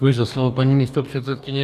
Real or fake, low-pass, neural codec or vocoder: fake; 14.4 kHz; codec, 44.1 kHz, 2.6 kbps, DAC